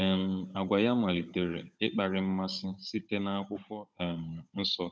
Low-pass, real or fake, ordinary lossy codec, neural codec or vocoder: none; fake; none; codec, 16 kHz, 16 kbps, FunCodec, trained on Chinese and English, 50 frames a second